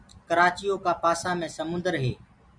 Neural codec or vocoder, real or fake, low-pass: none; real; 9.9 kHz